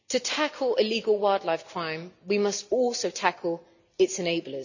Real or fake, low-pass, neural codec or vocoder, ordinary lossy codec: real; 7.2 kHz; none; none